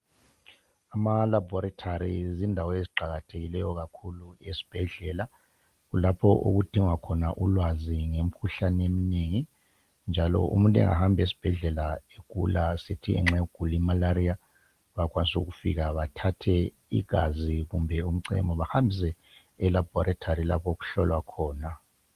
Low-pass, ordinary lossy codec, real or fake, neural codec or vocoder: 14.4 kHz; Opus, 24 kbps; real; none